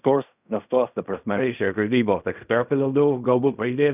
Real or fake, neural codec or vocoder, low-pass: fake; codec, 16 kHz in and 24 kHz out, 0.4 kbps, LongCat-Audio-Codec, fine tuned four codebook decoder; 3.6 kHz